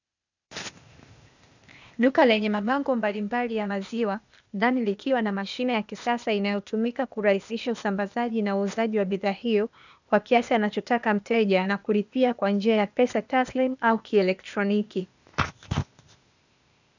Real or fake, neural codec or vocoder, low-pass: fake; codec, 16 kHz, 0.8 kbps, ZipCodec; 7.2 kHz